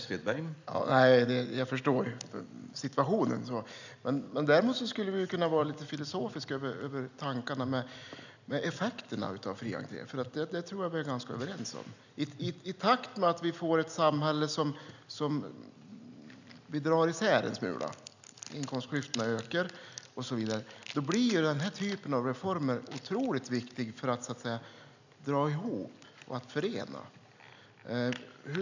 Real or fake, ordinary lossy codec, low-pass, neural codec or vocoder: real; none; 7.2 kHz; none